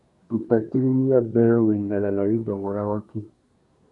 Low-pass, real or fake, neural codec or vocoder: 10.8 kHz; fake; codec, 24 kHz, 1 kbps, SNAC